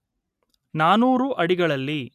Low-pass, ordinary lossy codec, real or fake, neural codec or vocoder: 14.4 kHz; none; real; none